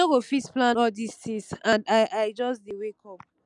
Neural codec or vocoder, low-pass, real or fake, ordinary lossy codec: none; 10.8 kHz; real; none